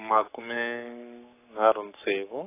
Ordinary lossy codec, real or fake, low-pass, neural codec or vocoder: AAC, 24 kbps; fake; 3.6 kHz; codec, 44.1 kHz, 7.8 kbps, Pupu-Codec